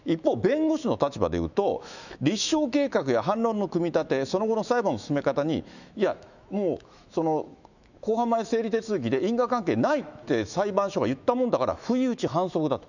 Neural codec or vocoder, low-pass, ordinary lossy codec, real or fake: autoencoder, 48 kHz, 128 numbers a frame, DAC-VAE, trained on Japanese speech; 7.2 kHz; none; fake